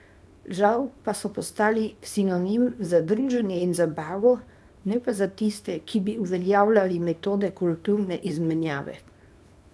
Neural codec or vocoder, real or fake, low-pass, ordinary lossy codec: codec, 24 kHz, 0.9 kbps, WavTokenizer, small release; fake; none; none